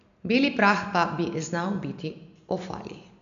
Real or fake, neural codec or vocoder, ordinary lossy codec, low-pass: real; none; none; 7.2 kHz